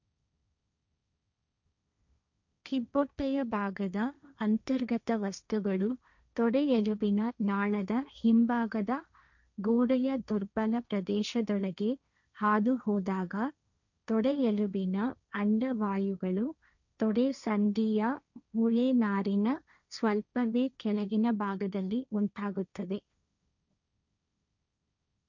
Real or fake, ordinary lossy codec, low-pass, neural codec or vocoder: fake; none; 7.2 kHz; codec, 16 kHz, 1.1 kbps, Voila-Tokenizer